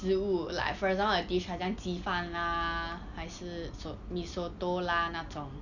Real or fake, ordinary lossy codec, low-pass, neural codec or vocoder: real; none; 7.2 kHz; none